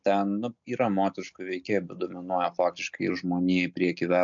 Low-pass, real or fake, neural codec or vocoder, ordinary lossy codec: 7.2 kHz; real; none; MP3, 64 kbps